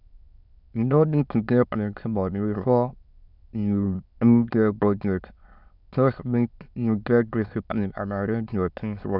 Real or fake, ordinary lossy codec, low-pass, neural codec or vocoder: fake; none; 5.4 kHz; autoencoder, 22.05 kHz, a latent of 192 numbers a frame, VITS, trained on many speakers